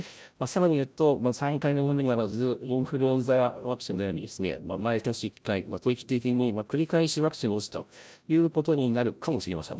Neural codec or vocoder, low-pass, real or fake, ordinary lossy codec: codec, 16 kHz, 0.5 kbps, FreqCodec, larger model; none; fake; none